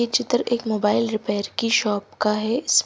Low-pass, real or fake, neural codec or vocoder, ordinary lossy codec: none; real; none; none